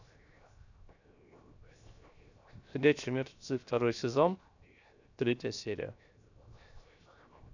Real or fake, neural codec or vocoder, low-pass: fake; codec, 16 kHz, 0.7 kbps, FocalCodec; 7.2 kHz